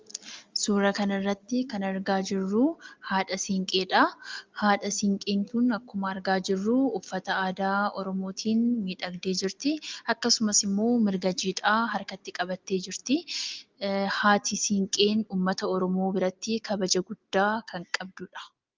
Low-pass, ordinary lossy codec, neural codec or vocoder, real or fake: 7.2 kHz; Opus, 32 kbps; none; real